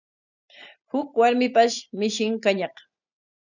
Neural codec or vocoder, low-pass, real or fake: none; 7.2 kHz; real